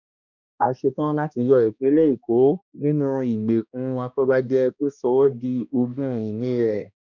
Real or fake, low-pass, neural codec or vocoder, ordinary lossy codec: fake; 7.2 kHz; codec, 16 kHz, 1 kbps, X-Codec, HuBERT features, trained on balanced general audio; none